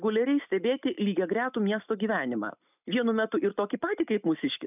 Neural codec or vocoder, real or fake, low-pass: none; real; 3.6 kHz